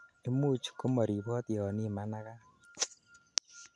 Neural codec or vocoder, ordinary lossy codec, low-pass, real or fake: none; none; 9.9 kHz; real